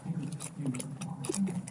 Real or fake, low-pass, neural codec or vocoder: real; 10.8 kHz; none